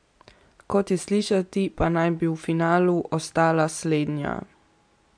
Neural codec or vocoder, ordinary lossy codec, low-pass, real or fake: none; MP3, 64 kbps; 9.9 kHz; real